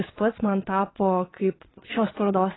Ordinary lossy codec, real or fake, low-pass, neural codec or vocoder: AAC, 16 kbps; fake; 7.2 kHz; vocoder, 22.05 kHz, 80 mel bands, WaveNeXt